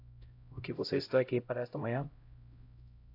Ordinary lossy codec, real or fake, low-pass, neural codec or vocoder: AAC, 32 kbps; fake; 5.4 kHz; codec, 16 kHz, 0.5 kbps, X-Codec, HuBERT features, trained on LibriSpeech